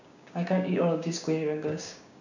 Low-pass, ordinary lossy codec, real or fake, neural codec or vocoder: 7.2 kHz; none; fake; codec, 16 kHz, 6 kbps, DAC